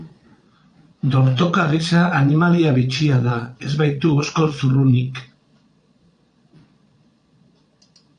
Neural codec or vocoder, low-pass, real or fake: vocoder, 22.05 kHz, 80 mel bands, Vocos; 9.9 kHz; fake